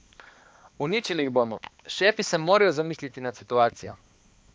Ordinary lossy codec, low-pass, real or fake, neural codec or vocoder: none; none; fake; codec, 16 kHz, 2 kbps, X-Codec, HuBERT features, trained on balanced general audio